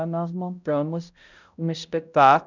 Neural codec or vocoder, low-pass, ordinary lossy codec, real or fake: codec, 16 kHz, 0.5 kbps, X-Codec, HuBERT features, trained on balanced general audio; 7.2 kHz; none; fake